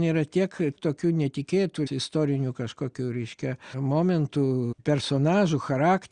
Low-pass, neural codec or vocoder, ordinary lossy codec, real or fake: 9.9 kHz; none; Opus, 64 kbps; real